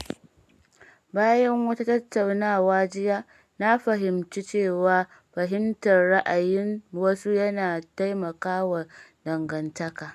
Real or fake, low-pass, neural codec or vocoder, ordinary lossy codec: real; 14.4 kHz; none; AAC, 96 kbps